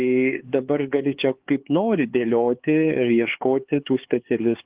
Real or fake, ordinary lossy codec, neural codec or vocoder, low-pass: fake; Opus, 16 kbps; codec, 16 kHz, 4 kbps, X-Codec, HuBERT features, trained on LibriSpeech; 3.6 kHz